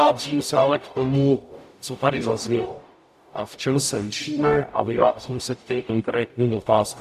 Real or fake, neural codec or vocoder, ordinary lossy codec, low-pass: fake; codec, 44.1 kHz, 0.9 kbps, DAC; AAC, 96 kbps; 14.4 kHz